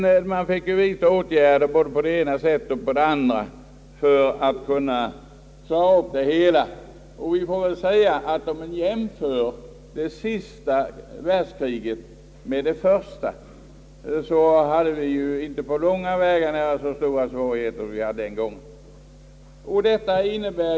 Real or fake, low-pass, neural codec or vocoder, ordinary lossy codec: real; none; none; none